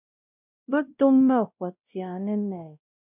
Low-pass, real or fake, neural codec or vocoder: 3.6 kHz; fake; codec, 16 kHz, 0.5 kbps, X-Codec, WavLM features, trained on Multilingual LibriSpeech